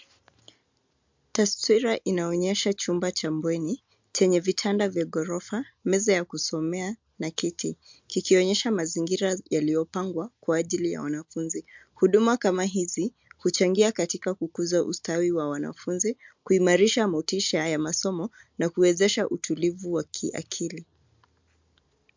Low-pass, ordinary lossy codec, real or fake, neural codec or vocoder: 7.2 kHz; MP3, 64 kbps; real; none